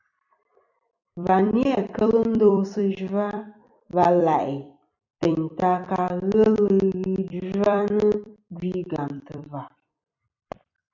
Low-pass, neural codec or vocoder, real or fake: 7.2 kHz; vocoder, 44.1 kHz, 128 mel bands every 256 samples, BigVGAN v2; fake